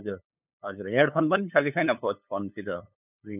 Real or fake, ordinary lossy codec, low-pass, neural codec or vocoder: fake; none; 3.6 kHz; codec, 16 kHz, 8 kbps, FunCodec, trained on LibriTTS, 25 frames a second